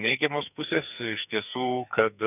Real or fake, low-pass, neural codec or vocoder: fake; 3.6 kHz; codec, 44.1 kHz, 2.6 kbps, SNAC